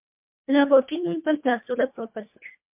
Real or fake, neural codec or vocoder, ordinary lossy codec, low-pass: fake; codec, 24 kHz, 1.5 kbps, HILCodec; MP3, 32 kbps; 3.6 kHz